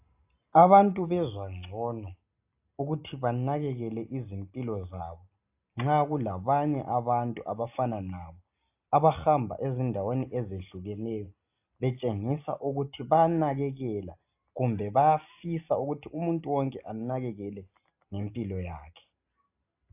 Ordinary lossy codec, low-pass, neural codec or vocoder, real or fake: AAC, 32 kbps; 3.6 kHz; none; real